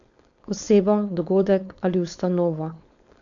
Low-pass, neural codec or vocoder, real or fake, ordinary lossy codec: 7.2 kHz; codec, 16 kHz, 4.8 kbps, FACodec; fake; none